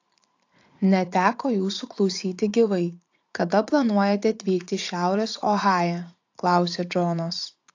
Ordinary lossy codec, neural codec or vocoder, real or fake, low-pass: AAC, 48 kbps; vocoder, 44.1 kHz, 80 mel bands, Vocos; fake; 7.2 kHz